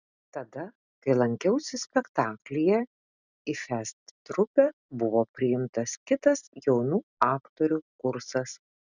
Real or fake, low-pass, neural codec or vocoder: real; 7.2 kHz; none